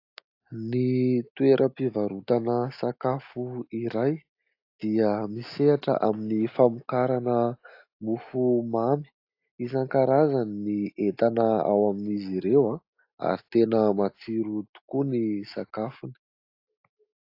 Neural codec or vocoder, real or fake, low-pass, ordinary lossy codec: none; real; 5.4 kHz; AAC, 32 kbps